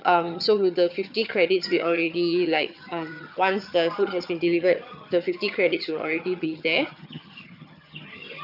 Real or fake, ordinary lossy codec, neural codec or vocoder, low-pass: fake; none; vocoder, 22.05 kHz, 80 mel bands, HiFi-GAN; 5.4 kHz